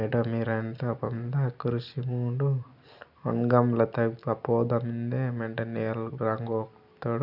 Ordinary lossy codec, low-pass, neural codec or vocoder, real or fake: none; 5.4 kHz; none; real